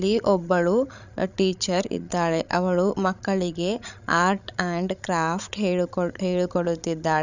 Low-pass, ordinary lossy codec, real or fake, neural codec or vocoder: 7.2 kHz; none; fake; codec, 16 kHz, 16 kbps, FreqCodec, larger model